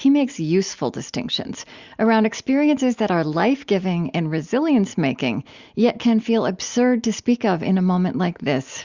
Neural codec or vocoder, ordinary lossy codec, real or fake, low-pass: none; Opus, 64 kbps; real; 7.2 kHz